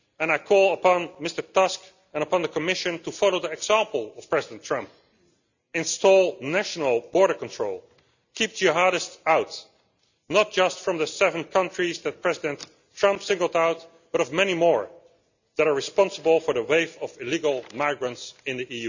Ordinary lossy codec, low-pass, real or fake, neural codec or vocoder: none; 7.2 kHz; real; none